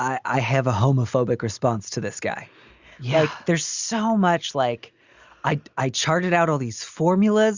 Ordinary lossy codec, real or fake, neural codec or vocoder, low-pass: Opus, 64 kbps; real; none; 7.2 kHz